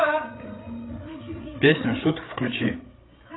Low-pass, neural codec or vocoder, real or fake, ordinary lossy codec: 7.2 kHz; codec, 16 kHz, 8 kbps, FreqCodec, larger model; fake; AAC, 16 kbps